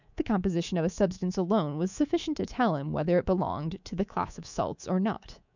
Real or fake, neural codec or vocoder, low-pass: fake; codec, 24 kHz, 3.1 kbps, DualCodec; 7.2 kHz